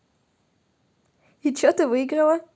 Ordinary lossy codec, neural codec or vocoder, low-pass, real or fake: none; none; none; real